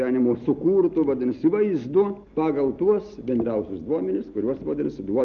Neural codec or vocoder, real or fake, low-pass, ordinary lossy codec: none; real; 7.2 kHz; Opus, 24 kbps